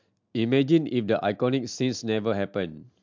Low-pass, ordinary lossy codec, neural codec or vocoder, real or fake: 7.2 kHz; MP3, 64 kbps; none; real